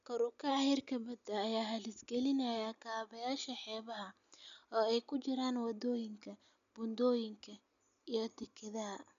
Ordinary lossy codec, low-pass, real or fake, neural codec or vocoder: none; 7.2 kHz; real; none